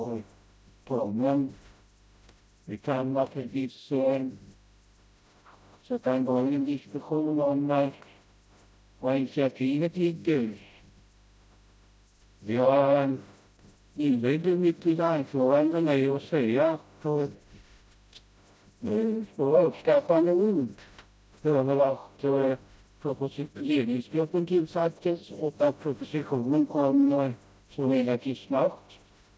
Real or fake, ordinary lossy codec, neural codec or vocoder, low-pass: fake; none; codec, 16 kHz, 0.5 kbps, FreqCodec, smaller model; none